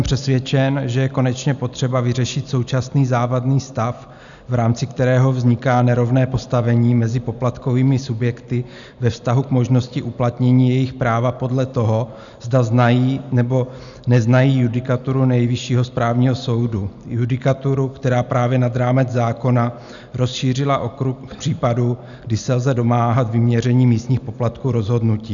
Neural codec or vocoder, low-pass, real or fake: none; 7.2 kHz; real